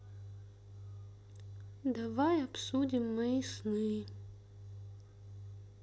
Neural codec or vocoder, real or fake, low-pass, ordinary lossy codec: none; real; none; none